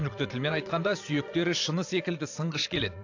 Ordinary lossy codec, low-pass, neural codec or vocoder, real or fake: AAC, 48 kbps; 7.2 kHz; vocoder, 22.05 kHz, 80 mel bands, Vocos; fake